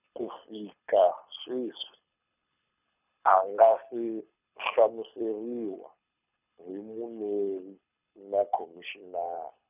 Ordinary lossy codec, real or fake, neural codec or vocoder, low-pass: none; fake; codec, 24 kHz, 6 kbps, HILCodec; 3.6 kHz